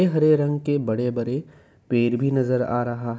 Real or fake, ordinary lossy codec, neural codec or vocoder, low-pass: real; none; none; none